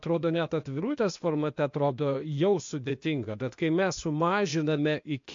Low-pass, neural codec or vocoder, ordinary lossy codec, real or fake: 7.2 kHz; codec, 16 kHz, 0.8 kbps, ZipCodec; MP3, 48 kbps; fake